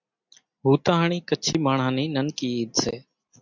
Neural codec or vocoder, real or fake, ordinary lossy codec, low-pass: none; real; MP3, 64 kbps; 7.2 kHz